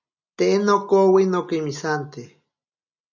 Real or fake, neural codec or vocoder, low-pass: real; none; 7.2 kHz